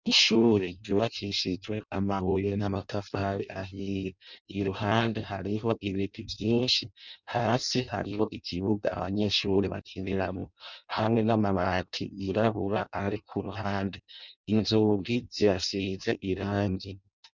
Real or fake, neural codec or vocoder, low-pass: fake; codec, 16 kHz in and 24 kHz out, 0.6 kbps, FireRedTTS-2 codec; 7.2 kHz